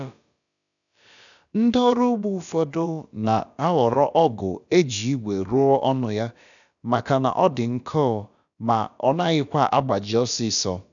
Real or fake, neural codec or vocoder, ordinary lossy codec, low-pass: fake; codec, 16 kHz, about 1 kbps, DyCAST, with the encoder's durations; none; 7.2 kHz